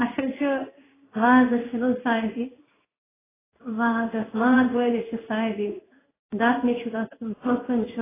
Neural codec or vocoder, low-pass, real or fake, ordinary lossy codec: codec, 16 kHz in and 24 kHz out, 1 kbps, XY-Tokenizer; 3.6 kHz; fake; AAC, 16 kbps